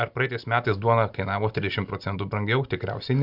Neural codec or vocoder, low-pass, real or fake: none; 5.4 kHz; real